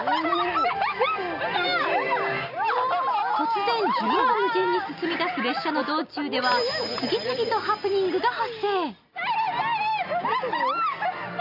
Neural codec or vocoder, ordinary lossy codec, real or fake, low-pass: none; none; real; 5.4 kHz